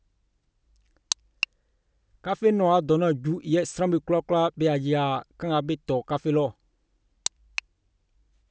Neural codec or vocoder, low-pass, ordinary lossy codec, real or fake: none; none; none; real